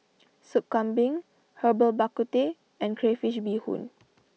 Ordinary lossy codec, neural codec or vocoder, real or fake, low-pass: none; none; real; none